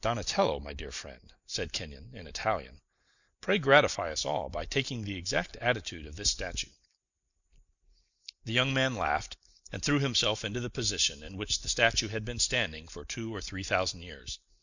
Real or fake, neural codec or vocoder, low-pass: real; none; 7.2 kHz